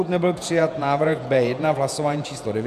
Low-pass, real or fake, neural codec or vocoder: 14.4 kHz; fake; vocoder, 48 kHz, 128 mel bands, Vocos